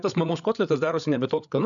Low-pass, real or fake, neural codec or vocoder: 7.2 kHz; fake; codec, 16 kHz, 8 kbps, FreqCodec, larger model